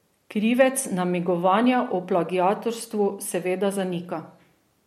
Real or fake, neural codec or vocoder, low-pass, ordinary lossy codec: real; none; 19.8 kHz; MP3, 64 kbps